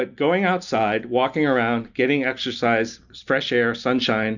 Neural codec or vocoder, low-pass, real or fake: none; 7.2 kHz; real